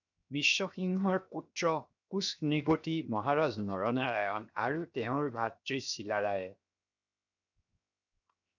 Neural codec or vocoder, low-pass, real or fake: codec, 16 kHz, 0.7 kbps, FocalCodec; 7.2 kHz; fake